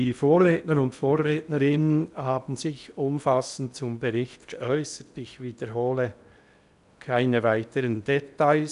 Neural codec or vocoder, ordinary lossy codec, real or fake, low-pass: codec, 16 kHz in and 24 kHz out, 0.8 kbps, FocalCodec, streaming, 65536 codes; none; fake; 10.8 kHz